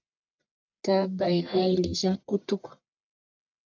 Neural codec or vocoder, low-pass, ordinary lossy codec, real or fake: codec, 44.1 kHz, 1.7 kbps, Pupu-Codec; 7.2 kHz; MP3, 64 kbps; fake